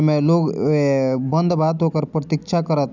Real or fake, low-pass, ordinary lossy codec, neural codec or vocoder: real; 7.2 kHz; none; none